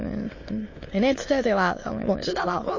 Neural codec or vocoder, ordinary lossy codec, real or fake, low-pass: autoencoder, 22.05 kHz, a latent of 192 numbers a frame, VITS, trained on many speakers; MP3, 32 kbps; fake; 7.2 kHz